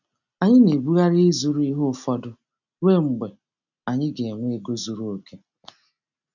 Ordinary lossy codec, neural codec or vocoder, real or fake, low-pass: none; none; real; 7.2 kHz